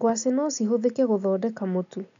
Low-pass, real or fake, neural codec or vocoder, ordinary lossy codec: 7.2 kHz; real; none; none